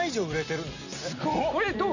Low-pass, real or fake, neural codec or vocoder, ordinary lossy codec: 7.2 kHz; real; none; none